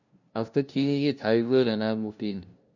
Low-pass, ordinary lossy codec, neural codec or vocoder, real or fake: 7.2 kHz; none; codec, 16 kHz, 0.5 kbps, FunCodec, trained on LibriTTS, 25 frames a second; fake